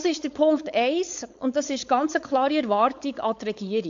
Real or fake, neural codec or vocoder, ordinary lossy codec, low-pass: fake; codec, 16 kHz, 4.8 kbps, FACodec; AAC, 64 kbps; 7.2 kHz